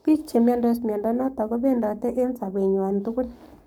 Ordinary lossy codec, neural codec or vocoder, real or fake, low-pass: none; codec, 44.1 kHz, 7.8 kbps, Pupu-Codec; fake; none